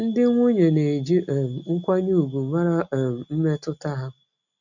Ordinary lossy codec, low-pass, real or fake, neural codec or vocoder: none; 7.2 kHz; real; none